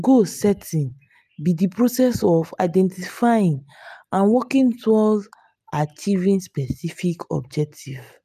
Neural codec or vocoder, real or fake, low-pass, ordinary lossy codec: none; real; 14.4 kHz; none